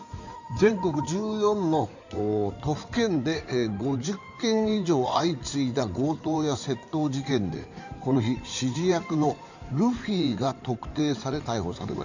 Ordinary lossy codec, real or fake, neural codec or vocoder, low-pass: none; fake; codec, 16 kHz in and 24 kHz out, 2.2 kbps, FireRedTTS-2 codec; 7.2 kHz